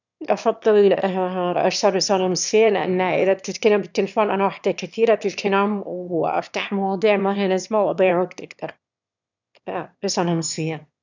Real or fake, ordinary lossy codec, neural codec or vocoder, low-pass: fake; none; autoencoder, 22.05 kHz, a latent of 192 numbers a frame, VITS, trained on one speaker; 7.2 kHz